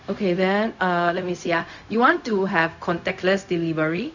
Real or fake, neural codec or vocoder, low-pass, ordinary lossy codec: fake; codec, 16 kHz, 0.4 kbps, LongCat-Audio-Codec; 7.2 kHz; none